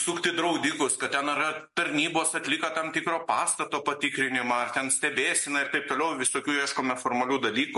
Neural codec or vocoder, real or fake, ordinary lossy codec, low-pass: none; real; MP3, 48 kbps; 14.4 kHz